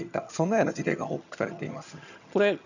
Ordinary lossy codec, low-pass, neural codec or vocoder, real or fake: none; 7.2 kHz; vocoder, 22.05 kHz, 80 mel bands, HiFi-GAN; fake